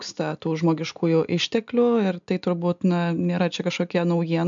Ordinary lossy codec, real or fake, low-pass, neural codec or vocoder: MP3, 64 kbps; real; 7.2 kHz; none